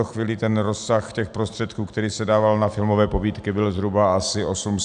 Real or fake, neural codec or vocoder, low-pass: real; none; 9.9 kHz